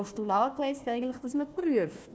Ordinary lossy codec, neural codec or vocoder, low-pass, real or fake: none; codec, 16 kHz, 1 kbps, FunCodec, trained on Chinese and English, 50 frames a second; none; fake